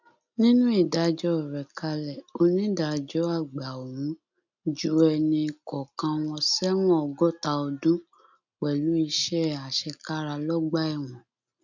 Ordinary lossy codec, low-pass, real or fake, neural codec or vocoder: none; 7.2 kHz; real; none